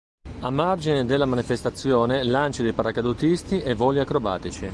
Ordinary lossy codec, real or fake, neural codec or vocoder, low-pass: Opus, 24 kbps; real; none; 10.8 kHz